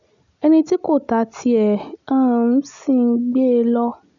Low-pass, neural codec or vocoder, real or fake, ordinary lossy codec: 7.2 kHz; none; real; none